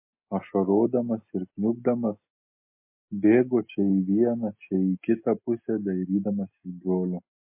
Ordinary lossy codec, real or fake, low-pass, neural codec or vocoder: MP3, 24 kbps; real; 3.6 kHz; none